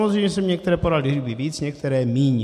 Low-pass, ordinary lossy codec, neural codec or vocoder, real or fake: 14.4 kHz; MP3, 64 kbps; none; real